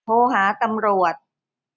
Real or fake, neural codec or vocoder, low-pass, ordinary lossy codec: real; none; 7.2 kHz; none